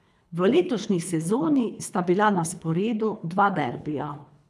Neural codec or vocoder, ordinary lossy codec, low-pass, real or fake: codec, 24 kHz, 3 kbps, HILCodec; none; none; fake